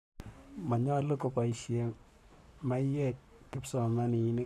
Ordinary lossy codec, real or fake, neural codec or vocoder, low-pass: none; fake; codec, 44.1 kHz, 7.8 kbps, Pupu-Codec; 14.4 kHz